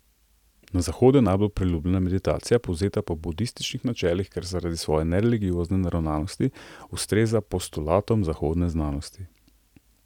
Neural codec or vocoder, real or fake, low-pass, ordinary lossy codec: none; real; 19.8 kHz; none